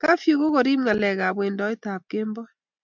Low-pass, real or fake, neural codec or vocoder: 7.2 kHz; real; none